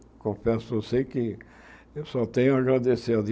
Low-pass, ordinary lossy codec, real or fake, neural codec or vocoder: none; none; real; none